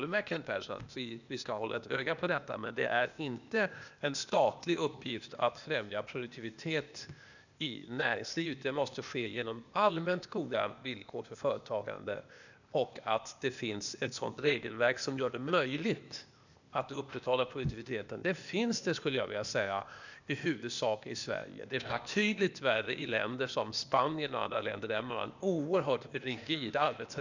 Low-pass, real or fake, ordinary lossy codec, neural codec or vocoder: 7.2 kHz; fake; none; codec, 16 kHz, 0.8 kbps, ZipCodec